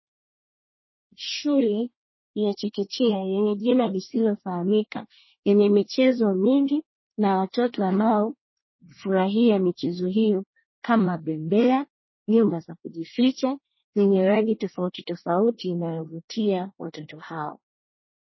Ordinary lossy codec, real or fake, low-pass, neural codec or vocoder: MP3, 24 kbps; fake; 7.2 kHz; codec, 24 kHz, 1 kbps, SNAC